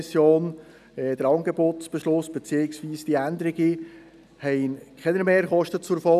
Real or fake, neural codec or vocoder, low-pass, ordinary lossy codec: real; none; 14.4 kHz; none